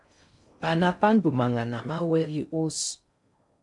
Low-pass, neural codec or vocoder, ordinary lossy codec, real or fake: 10.8 kHz; codec, 16 kHz in and 24 kHz out, 0.6 kbps, FocalCodec, streaming, 4096 codes; MP3, 64 kbps; fake